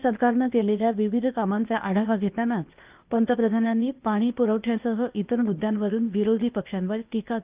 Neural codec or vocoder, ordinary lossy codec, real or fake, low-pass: codec, 16 kHz, 0.8 kbps, ZipCodec; Opus, 24 kbps; fake; 3.6 kHz